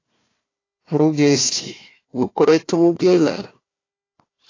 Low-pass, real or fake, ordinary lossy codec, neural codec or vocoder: 7.2 kHz; fake; AAC, 32 kbps; codec, 16 kHz, 1 kbps, FunCodec, trained on Chinese and English, 50 frames a second